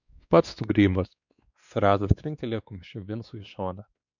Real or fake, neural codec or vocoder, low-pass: fake; codec, 16 kHz, 2 kbps, X-Codec, WavLM features, trained on Multilingual LibriSpeech; 7.2 kHz